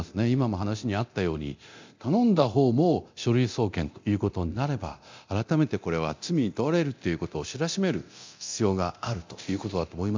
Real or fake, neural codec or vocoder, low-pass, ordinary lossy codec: fake; codec, 24 kHz, 0.9 kbps, DualCodec; 7.2 kHz; MP3, 64 kbps